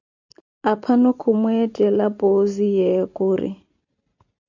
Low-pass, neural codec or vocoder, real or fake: 7.2 kHz; none; real